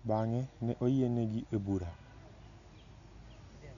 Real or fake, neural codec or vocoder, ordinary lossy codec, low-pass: real; none; none; 7.2 kHz